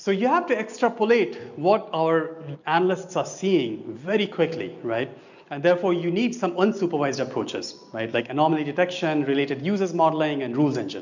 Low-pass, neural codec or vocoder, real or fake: 7.2 kHz; none; real